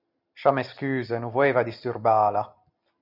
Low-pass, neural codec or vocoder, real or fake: 5.4 kHz; none; real